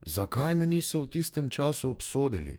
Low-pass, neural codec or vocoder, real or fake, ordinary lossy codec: none; codec, 44.1 kHz, 2.6 kbps, DAC; fake; none